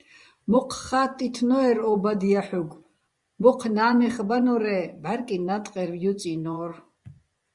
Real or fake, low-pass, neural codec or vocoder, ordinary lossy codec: fake; 10.8 kHz; vocoder, 24 kHz, 100 mel bands, Vocos; Opus, 64 kbps